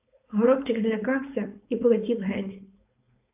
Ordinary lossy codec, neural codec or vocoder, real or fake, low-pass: AAC, 32 kbps; codec, 16 kHz, 4.8 kbps, FACodec; fake; 3.6 kHz